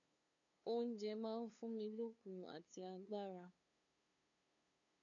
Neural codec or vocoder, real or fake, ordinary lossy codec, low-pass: codec, 16 kHz, 2 kbps, FunCodec, trained on LibriTTS, 25 frames a second; fake; MP3, 64 kbps; 7.2 kHz